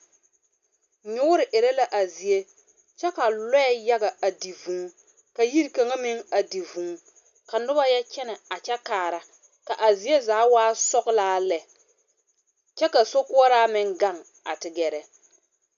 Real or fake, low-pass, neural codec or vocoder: real; 7.2 kHz; none